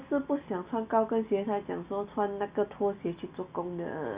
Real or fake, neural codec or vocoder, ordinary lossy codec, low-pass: real; none; Opus, 64 kbps; 3.6 kHz